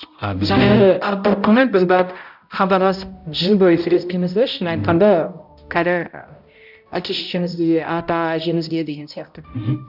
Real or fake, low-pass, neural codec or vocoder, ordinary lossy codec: fake; 5.4 kHz; codec, 16 kHz, 0.5 kbps, X-Codec, HuBERT features, trained on balanced general audio; none